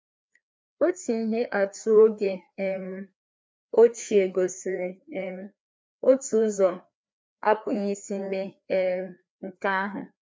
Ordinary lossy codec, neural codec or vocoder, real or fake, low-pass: none; codec, 16 kHz, 2 kbps, FreqCodec, larger model; fake; none